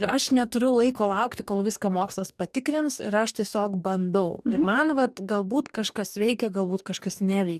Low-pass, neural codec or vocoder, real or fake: 14.4 kHz; codec, 44.1 kHz, 2.6 kbps, DAC; fake